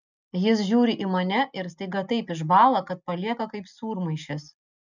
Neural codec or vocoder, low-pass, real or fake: none; 7.2 kHz; real